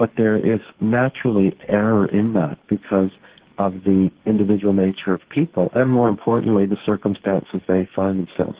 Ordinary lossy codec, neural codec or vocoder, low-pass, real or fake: Opus, 16 kbps; codec, 44.1 kHz, 2.6 kbps, SNAC; 3.6 kHz; fake